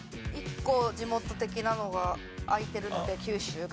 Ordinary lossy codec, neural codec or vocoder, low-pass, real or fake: none; none; none; real